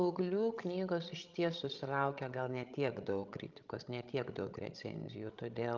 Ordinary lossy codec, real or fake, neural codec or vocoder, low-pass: Opus, 32 kbps; fake; codec, 16 kHz, 16 kbps, FreqCodec, larger model; 7.2 kHz